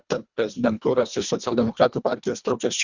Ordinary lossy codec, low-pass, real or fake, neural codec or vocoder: Opus, 64 kbps; 7.2 kHz; fake; codec, 24 kHz, 1.5 kbps, HILCodec